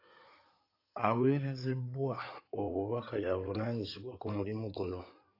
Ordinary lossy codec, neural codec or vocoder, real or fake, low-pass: AAC, 32 kbps; codec, 16 kHz in and 24 kHz out, 2.2 kbps, FireRedTTS-2 codec; fake; 5.4 kHz